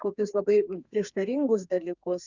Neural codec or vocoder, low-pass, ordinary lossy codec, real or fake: codec, 32 kHz, 1.9 kbps, SNAC; 7.2 kHz; Opus, 64 kbps; fake